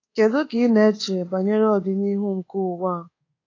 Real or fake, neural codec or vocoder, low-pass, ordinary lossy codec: fake; codec, 24 kHz, 1.2 kbps, DualCodec; 7.2 kHz; AAC, 32 kbps